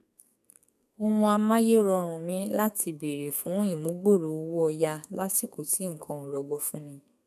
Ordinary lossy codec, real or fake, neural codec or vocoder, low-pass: none; fake; codec, 32 kHz, 1.9 kbps, SNAC; 14.4 kHz